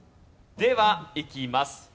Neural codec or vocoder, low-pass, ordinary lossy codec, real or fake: none; none; none; real